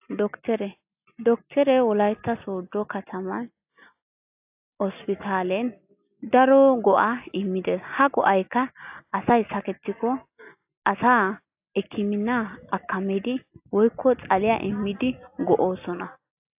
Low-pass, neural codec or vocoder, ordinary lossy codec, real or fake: 3.6 kHz; none; AAC, 32 kbps; real